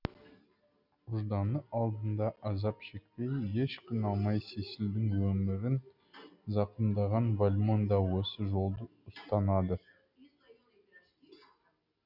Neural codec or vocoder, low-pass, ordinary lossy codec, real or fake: none; 5.4 kHz; none; real